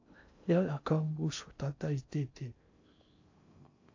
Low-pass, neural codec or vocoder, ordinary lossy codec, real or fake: 7.2 kHz; codec, 16 kHz in and 24 kHz out, 0.6 kbps, FocalCodec, streaming, 2048 codes; AAC, 48 kbps; fake